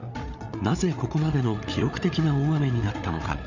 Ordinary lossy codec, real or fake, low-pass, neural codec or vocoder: none; fake; 7.2 kHz; codec, 16 kHz, 8 kbps, FreqCodec, larger model